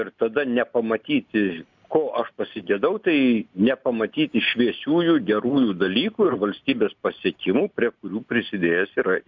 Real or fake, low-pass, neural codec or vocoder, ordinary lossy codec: real; 7.2 kHz; none; MP3, 64 kbps